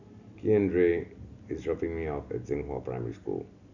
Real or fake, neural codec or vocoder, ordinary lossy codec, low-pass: real; none; none; 7.2 kHz